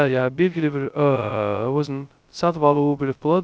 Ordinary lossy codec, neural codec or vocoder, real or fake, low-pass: none; codec, 16 kHz, 0.2 kbps, FocalCodec; fake; none